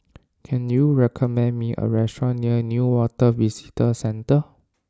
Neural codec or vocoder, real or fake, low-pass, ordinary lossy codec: none; real; none; none